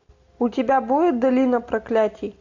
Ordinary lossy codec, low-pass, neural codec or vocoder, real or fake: AAC, 48 kbps; 7.2 kHz; none; real